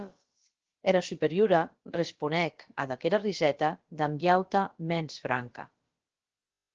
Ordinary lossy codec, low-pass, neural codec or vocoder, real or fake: Opus, 16 kbps; 7.2 kHz; codec, 16 kHz, about 1 kbps, DyCAST, with the encoder's durations; fake